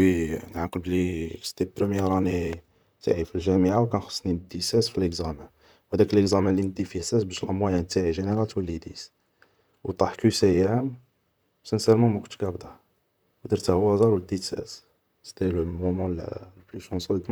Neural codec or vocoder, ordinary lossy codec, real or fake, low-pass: vocoder, 44.1 kHz, 128 mel bands, Pupu-Vocoder; none; fake; none